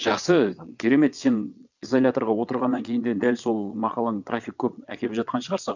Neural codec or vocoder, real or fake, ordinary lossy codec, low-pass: vocoder, 22.05 kHz, 80 mel bands, Vocos; fake; none; 7.2 kHz